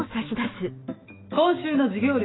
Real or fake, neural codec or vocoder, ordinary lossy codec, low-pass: fake; autoencoder, 48 kHz, 128 numbers a frame, DAC-VAE, trained on Japanese speech; AAC, 16 kbps; 7.2 kHz